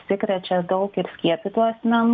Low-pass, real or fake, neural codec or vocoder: 7.2 kHz; real; none